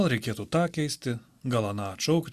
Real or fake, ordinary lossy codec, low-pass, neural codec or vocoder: real; Opus, 64 kbps; 14.4 kHz; none